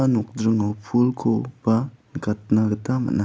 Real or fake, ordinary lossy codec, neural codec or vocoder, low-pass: real; none; none; none